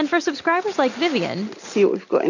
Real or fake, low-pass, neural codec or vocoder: real; 7.2 kHz; none